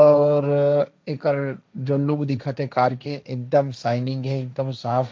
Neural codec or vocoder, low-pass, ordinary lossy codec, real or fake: codec, 16 kHz, 1.1 kbps, Voila-Tokenizer; none; none; fake